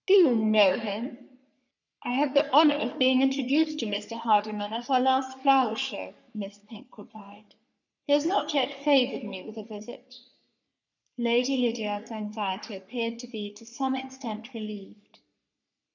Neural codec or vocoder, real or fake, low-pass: codec, 44.1 kHz, 3.4 kbps, Pupu-Codec; fake; 7.2 kHz